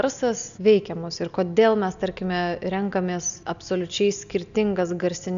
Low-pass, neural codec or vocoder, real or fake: 7.2 kHz; none; real